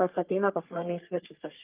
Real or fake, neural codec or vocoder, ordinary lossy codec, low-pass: fake; codec, 44.1 kHz, 3.4 kbps, Pupu-Codec; Opus, 24 kbps; 3.6 kHz